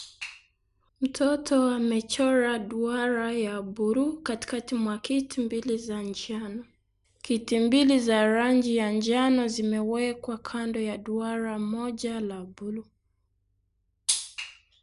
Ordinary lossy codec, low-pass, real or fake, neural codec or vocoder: none; 10.8 kHz; real; none